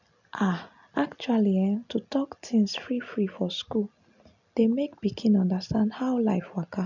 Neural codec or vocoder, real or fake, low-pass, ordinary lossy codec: none; real; 7.2 kHz; none